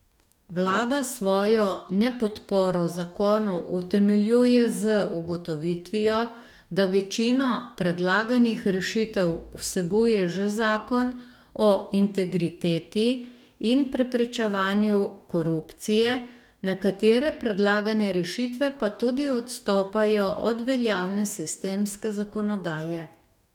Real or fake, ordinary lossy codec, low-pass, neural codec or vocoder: fake; none; 19.8 kHz; codec, 44.1 kHz, 2.6 kbps, DAC